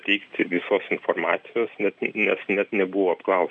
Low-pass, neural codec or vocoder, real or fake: 9.9 kHz; none; real